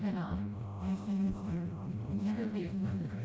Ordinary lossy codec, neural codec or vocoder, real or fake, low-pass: none; codec, 16 kHz, 0.5 kbps, FreqCodec, smaller model; fake; none